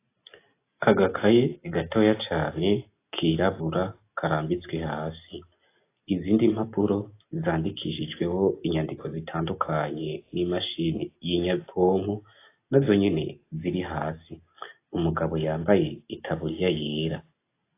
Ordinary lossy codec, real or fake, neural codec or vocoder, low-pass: AAC, 24 kbps; real; none; 3.6 kHz